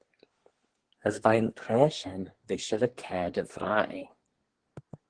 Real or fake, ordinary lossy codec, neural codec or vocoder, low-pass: fake; Opus, 16 kbps; codec, 24 kHz, 1 kbps, SNAC; 9.9 kHz